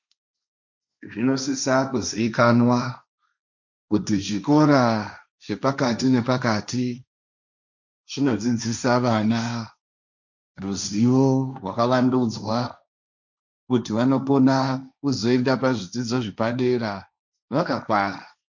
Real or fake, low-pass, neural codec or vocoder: fake; 7.2 kHz; codec, 16 kHz, 1.1 kbps, Voila-Tokenizer